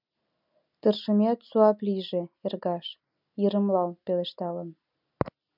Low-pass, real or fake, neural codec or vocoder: 5.4 kHz; real; none